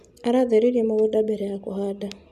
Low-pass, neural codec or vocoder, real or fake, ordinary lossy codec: 14.4 kHz; none; real; AAC, 96 kbps